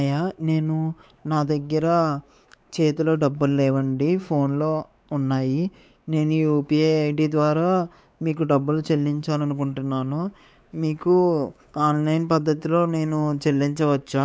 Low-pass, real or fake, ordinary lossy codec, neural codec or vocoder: none; fake; none; codec, 16 kHz, 4 kbps, X-Codec, WavLM features, trained on Multilingual LibriSpeech